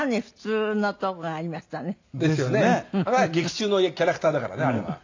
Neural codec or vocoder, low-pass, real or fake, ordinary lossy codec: none; 7.2 kHz; real; AAC, 48 kbps